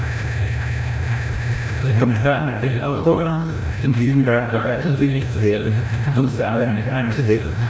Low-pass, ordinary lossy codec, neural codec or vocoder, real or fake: none; none; codec, 16 kHz, 0.5 kbps, FreqCodec, larger model; fake